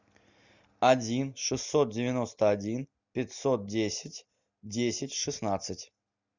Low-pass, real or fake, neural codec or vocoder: 7.2 kHz; real; none